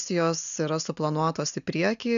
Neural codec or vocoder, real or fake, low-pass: none; real; 7.2 kHz